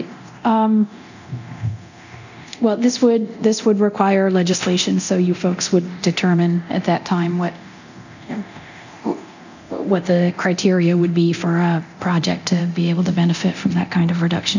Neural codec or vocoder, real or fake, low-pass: codec, 24 kHz, 0.9 kbps, DualCodec; fake; 7.2 kHz